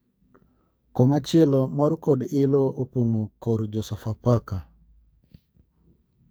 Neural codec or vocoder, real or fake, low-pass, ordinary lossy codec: codec, 44.1 kHz, 2.6 kbps, SNAC; fake; none; none